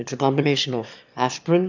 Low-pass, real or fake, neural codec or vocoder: 7.2 kHz; fake; autoencoder, 22.05 kHz, a latent of 192 numbers a frame, VITS, trained on one speaker